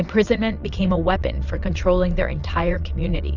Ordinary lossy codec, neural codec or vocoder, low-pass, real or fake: Opus, 64 kbps; vocoder, 22.05 kHz, 80 mel bands, Vocos; 7.2 kHz; fake